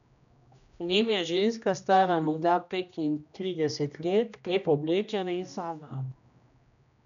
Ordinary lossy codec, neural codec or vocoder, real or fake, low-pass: MP3, 96 kbps; codec, 16 kHz, 1 kbps, X-Codec, HuBERT features, trained on general audio; fake; 7.2 kHz